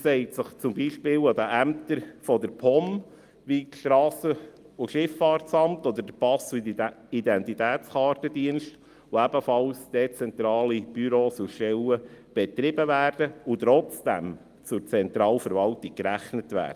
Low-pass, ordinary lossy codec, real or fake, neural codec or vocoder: 14.4 kHz; Opus, 32 kbps; real; none